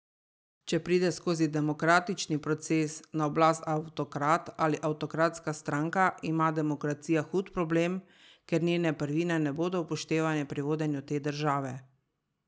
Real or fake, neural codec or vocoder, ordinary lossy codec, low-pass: real; none; none; none